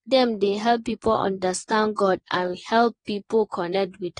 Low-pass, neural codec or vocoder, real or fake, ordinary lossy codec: 9.9 kHz; vocoder, 22.05 kHz, 80 mel bands, WaveNeXt; fake; AAC, 32 kbps